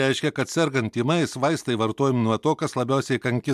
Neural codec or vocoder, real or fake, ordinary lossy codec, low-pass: none; real; MP3, 96 kbps; 14.4 kHz